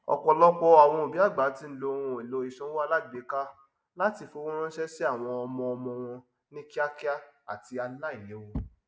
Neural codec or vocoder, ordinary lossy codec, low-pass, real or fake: none; none; none; real